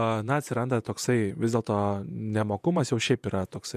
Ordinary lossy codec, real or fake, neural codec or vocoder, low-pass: MP3, 96 kbps; fake; vocoder, 48 kHz, 128 mel bands, Vocos; 14.4 kHz